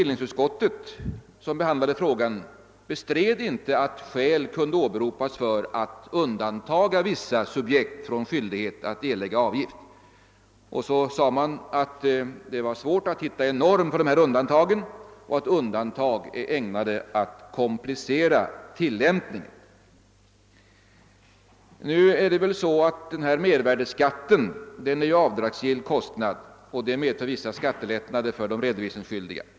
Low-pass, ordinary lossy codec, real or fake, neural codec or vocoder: none; none; real; none